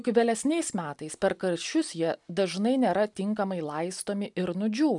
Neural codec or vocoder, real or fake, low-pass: none; real; 10.8 kHz